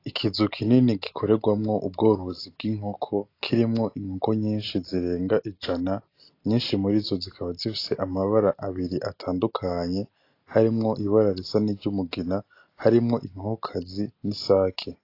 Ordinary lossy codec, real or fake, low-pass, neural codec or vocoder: AAC, 32 kbps; real; 5.4 kHz; none